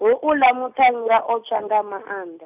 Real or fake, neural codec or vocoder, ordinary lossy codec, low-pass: real; none; none; 3.6 kHz